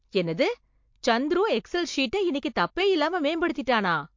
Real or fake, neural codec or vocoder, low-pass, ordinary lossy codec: real; none; 7.2 kHz; MP3, 48 kbps